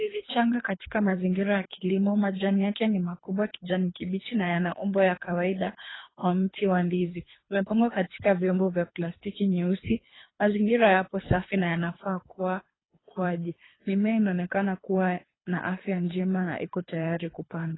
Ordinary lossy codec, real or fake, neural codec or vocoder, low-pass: AAC, 16 kbps; fake; codec, 24 kHz, 3 kbps, HILCodec; 7.2 kHz